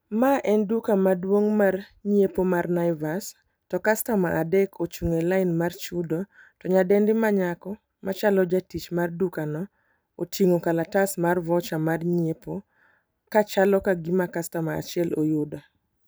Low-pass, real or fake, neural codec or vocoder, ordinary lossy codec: none; fake; vocoder, 44.1 kHz, 128 mel bands every 256 samples, BigVGAN v2; none